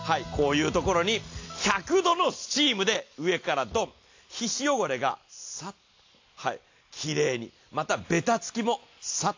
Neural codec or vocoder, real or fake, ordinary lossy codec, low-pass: none; real; AAC, 48 kbps; 7.2 kHz